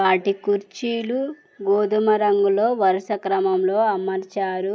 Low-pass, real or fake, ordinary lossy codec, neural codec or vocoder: none; real; none; none